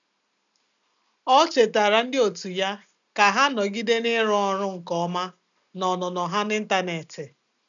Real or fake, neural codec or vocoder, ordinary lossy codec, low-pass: real; none; none; 7.2 kHz